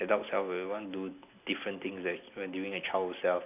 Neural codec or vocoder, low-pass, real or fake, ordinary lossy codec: none; 3.6 kHz; real; AAC, 32 kbps